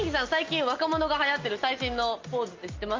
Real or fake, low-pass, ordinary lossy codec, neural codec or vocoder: real; 7.2 kHz; Opus, 24 kbps; none